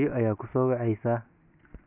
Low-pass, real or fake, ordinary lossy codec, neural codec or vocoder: 3.6 kHz; real; none; none